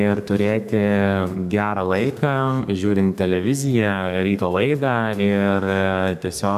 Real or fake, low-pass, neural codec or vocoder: fake; 14.4 kHz; codec, 32 kHz, 1.9 kbps, SNAC